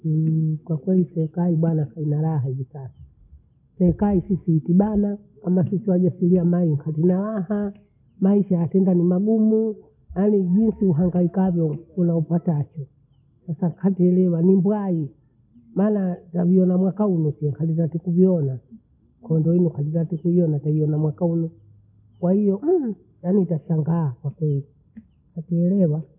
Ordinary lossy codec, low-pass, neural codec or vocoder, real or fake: none; 3.6 kHz; none; real